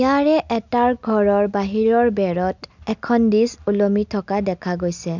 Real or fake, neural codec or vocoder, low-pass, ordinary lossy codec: real; none; 7.2 kHz; none